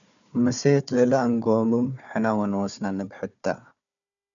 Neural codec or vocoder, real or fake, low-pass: codec, 16 kHz, 4 kbps, FunCodec, trained on Chinese and English, 50 frames a second; fake; 7.2 kHz